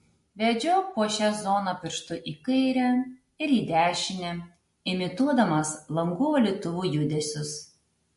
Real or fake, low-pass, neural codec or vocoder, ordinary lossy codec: real; 14.4 kHz; none; MP3, 48 kbps